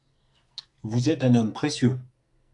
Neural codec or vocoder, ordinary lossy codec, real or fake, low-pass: codec, 44.1 kHz, 2.6 kbps, SNAC; AAC, 64 kbps; fake; 10.8 kHz